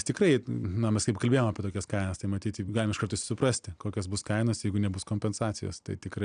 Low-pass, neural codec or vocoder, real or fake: 9.9 kHz; none; real